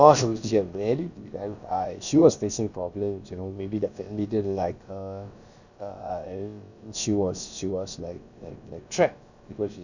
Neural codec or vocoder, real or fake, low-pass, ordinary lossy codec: codec, 16 kHz, about 1 kbps, DyCAST, with the encoder's durations; fake; 7.2 kHz; none